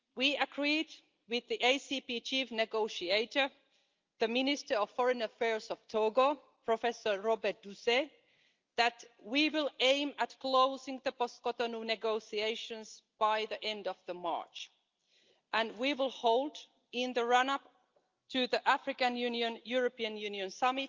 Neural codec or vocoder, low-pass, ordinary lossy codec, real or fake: none; 7.2 kHz; Opus, 24 kbps; real